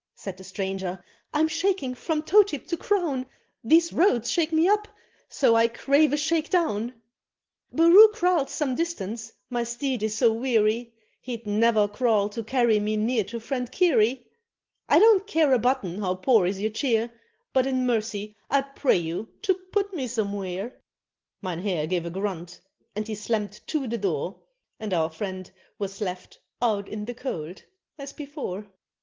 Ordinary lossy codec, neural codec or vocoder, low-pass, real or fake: Opus, 16 kbps; none; 7.2 kHz; real